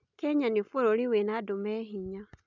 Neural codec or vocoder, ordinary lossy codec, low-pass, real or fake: none; none; 7.2 kHz; real